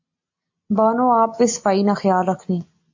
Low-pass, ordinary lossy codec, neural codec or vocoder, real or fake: 7.2 kHz; AAC, 48 kbps; none; real